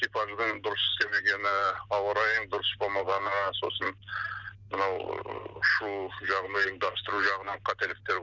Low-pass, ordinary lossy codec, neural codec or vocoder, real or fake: 7.2 kHz; none; none; real